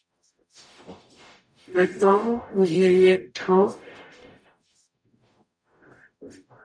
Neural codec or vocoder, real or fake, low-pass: codec, 44.1 kHz, 0.9 kbps, DAC; fake; 9.9 kHz